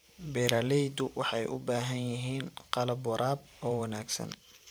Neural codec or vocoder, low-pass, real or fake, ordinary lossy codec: vocoder, 44.1 kHz, 128 mel bands every 512 samples, BigVGAN v2; none; fake; none